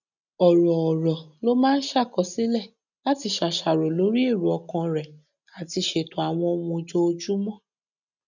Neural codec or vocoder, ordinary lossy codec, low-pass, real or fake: none; none; 7.2 kHz; real